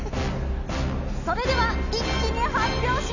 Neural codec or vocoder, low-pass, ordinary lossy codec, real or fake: none; 7.2 kHz; none; real